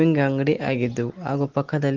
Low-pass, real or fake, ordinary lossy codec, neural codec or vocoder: 7.2 kHz; real; Opus, 16 kbps; none